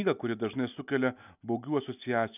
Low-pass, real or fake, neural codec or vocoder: 3.6 kHz; real; none